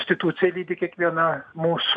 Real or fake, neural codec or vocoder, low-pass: real; none; 9.9 kHz